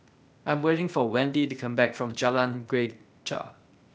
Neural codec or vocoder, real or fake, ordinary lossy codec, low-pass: codec, 16 kHz, 0.8 kbps, ZipCodec; fake; none; none